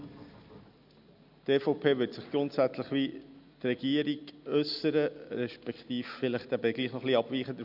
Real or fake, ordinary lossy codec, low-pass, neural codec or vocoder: real; none; 5.4 kHz; none